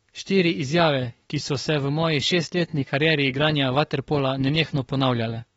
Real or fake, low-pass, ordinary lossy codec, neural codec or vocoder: fake; 19.8 kHz; AAC, 24 kbps; autoencoder, 48 kHz, 32 numbers a frame, DAC-VAE, trained on Japanese speech